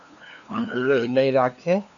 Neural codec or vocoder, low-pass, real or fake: codec, 16 kHz, 2 kbps, FunCodec, trained on LibriTTS, 25 frames a second; 7.2 kHz; fake